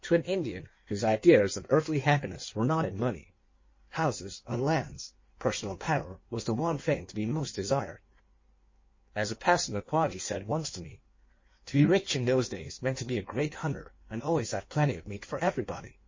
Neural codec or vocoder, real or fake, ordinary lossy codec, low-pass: codec, 16 kHz in and 24 kHz out, 1.1 kbps, FireRedTTS-2 codec; fake; MP3, 32 kbps; 7.2 kHz